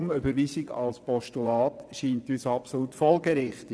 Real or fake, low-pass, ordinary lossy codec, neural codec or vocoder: fake; none; none; vocoder, 22.05 kHz, 80 mel bands, WaveNeXt